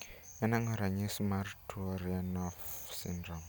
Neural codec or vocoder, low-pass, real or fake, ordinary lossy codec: none; none; real; none